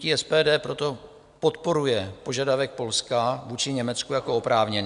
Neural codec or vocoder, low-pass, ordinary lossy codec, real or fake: none; 10.8 kHz; AAC, 96 kbps; real